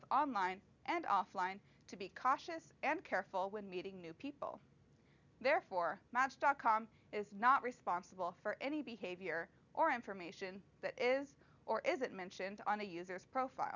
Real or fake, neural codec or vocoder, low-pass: real; none; 7.2 kHz